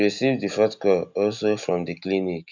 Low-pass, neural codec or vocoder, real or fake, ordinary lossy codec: 7.2 kHz; none; real; none